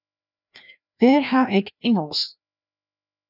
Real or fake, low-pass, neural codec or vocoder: fake; 5.4 kHz; codec, 16 kHz, 1 kbps, FreqCodec, larger model